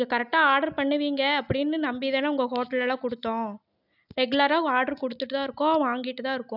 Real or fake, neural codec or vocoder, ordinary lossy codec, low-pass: real; none; none; 5.4 kHz